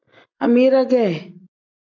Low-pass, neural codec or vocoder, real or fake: 7.2 kHz; none; real